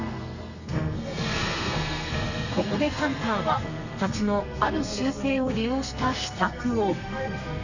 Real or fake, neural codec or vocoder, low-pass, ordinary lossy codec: fake; codec, 32 kHz, 1.9 kbps, SNAC; 7.2 kHz; none